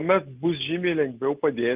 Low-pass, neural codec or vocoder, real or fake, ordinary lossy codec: 3.6 kHz; none; real; Opus, 24 kbps